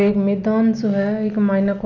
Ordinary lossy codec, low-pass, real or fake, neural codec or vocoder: none; 7.2 kHz; real; none